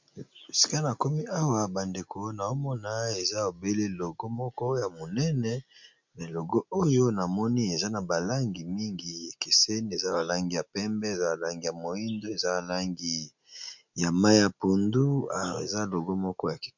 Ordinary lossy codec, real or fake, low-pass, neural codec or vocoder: MP3, 64 kbps; real; 7.2 kHz; none